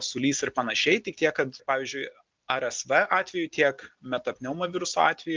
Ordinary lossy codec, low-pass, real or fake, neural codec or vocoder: Opus, 32 kbps; 7.2 kHz; real; none